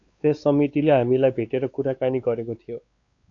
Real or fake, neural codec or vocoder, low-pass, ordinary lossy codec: fake; codec, 16 kHz, 4 kbps, X-Codec, WavLM features, trained on Multilingual LibriSpeech; 7.2 kHz; AAC, 48 kbps